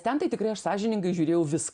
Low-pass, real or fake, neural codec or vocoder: 9.9 kHz; real; none